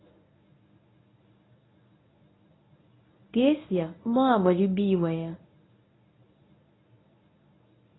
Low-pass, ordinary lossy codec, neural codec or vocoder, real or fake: 7.2 kHz; AAC, 16 kbps; codec, 24 kHz, 0.9 kbps, WavTokenizer, medium speech release version 1; fake